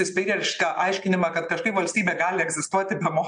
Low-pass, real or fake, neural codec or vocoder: 9.9 kHz; real; none